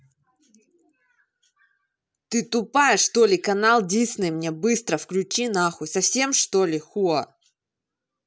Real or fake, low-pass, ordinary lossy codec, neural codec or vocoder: real; none; none; none